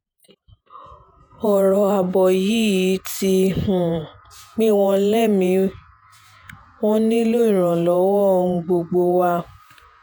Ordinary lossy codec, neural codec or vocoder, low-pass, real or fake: none; vocoder, 48 kHz, 128 mel bands, Vocos; none; fake